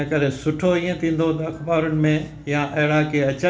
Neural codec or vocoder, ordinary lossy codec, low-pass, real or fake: none; none; none; real